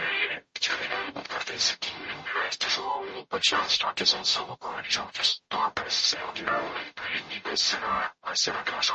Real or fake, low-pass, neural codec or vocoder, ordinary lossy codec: fake; 10.8 kHz; codec, 44.1 kHz, 0.9 kbps, DAC; MP3, 32 kbps